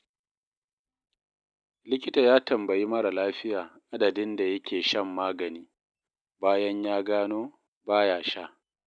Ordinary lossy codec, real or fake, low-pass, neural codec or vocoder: none; real; 9.9 kHz; none